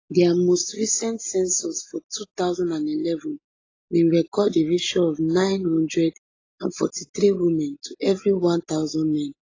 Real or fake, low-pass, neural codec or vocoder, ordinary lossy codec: real; 7.2 kHz; none; AAC, 32 kbps